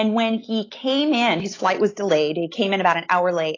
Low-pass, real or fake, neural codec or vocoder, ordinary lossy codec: 7.2 kHz; real; none; AAC, 32 kbps